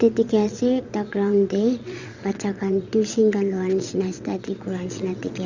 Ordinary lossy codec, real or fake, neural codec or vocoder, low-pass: Opus, 64 kbps; fake; vocoder, 22.05 kHz, 80 mel bands, WaveNeXt; 7.2 kHz